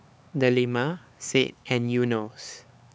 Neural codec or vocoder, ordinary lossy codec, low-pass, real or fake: codec, 16 kHz, 4 kbps, X-Codec, HuBERT features, trained on LibriSpeech; none; none; fake